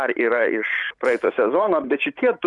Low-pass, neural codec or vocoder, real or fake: 10.8 kHz; vocoder, 44.1 kHz, 128 mel bands every 512 samples, BigVGAN v2; fake